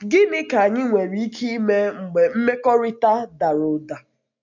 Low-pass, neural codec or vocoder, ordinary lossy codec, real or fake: 7.2 kHz; none; none; real